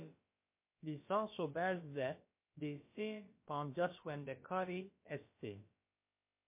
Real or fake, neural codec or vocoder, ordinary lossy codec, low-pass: fake; codec, 16 kHz, about 1 kbps, DyCAST, with the encoder's durations; MP3, 24 kbps; 3.6 kHz